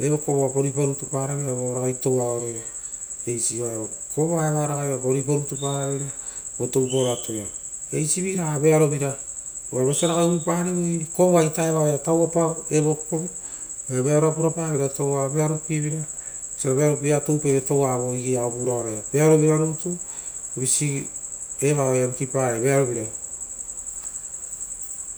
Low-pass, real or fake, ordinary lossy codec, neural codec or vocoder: none; real; none; none